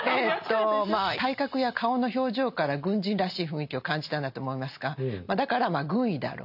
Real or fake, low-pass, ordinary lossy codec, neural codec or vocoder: real; 5.4 kHz; none; none